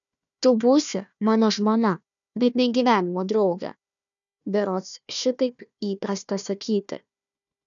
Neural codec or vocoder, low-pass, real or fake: codec, 16 kHz, 1 kbps, FunCodec, trained on Chinese and English, 50 frames a second; 7.2 kHz; fake